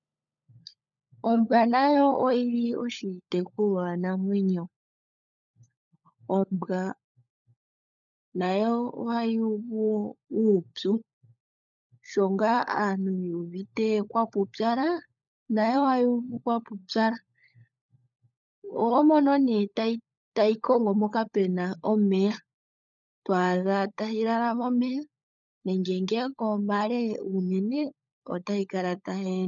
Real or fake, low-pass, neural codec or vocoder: fake; 7.2 kHz; codec, 16 kHz, 16 kbps, FunCodec, trained on LibriTTS, 50 frames a second